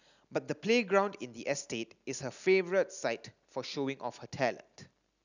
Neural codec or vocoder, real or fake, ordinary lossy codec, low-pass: none; real; none; 7.2 kHz